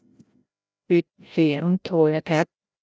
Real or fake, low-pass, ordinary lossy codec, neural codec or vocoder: fake; none; none; codec, 16 kHz, 0.5 kbps, FreqCodec, larger model